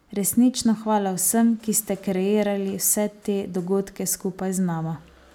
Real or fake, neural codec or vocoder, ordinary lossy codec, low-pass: real; none; none; none